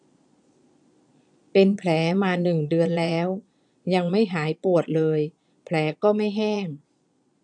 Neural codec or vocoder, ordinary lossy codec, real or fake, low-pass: vocoder, 22.05 kHz, 80 mel bands, Vocos; none; fake; 9.9 kHz